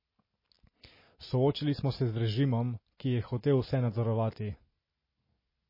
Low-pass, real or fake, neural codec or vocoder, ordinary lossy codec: 5.4 kHz; real; none; MP3, 24 kbps